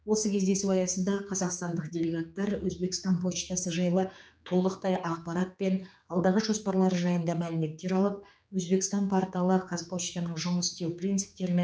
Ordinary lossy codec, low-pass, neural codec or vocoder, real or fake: none; none; codec, 16 kHz, 4 kbps, X-Codec, HuBERT features, trained on general audio; fake